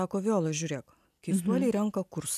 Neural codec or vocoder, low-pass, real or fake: none; 14.4 kHz; real